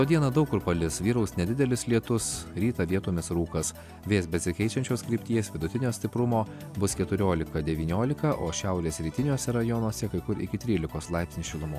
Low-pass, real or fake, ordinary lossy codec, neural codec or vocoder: 14.4 kHz; real; AAC, 96 kbps; none